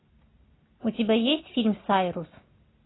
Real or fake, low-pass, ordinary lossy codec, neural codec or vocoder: real; 7.2 kHz; AAC, 16 kbps; none